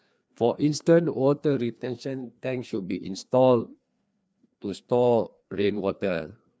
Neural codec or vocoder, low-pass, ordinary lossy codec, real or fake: codec, 16 kHz, 2 kbps, FreqCodec, larger model; none; none; fake